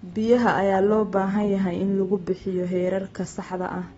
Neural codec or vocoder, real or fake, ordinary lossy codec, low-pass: none; real; AAC, 24 kbps; 19.8 kHz